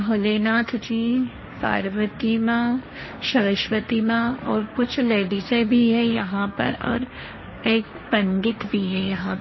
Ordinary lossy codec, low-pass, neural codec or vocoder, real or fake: MP3, 24 kbps; 7.2 kHz; codec, 16 kHz, 1.1 kbps, Voila-Tokenizer; fake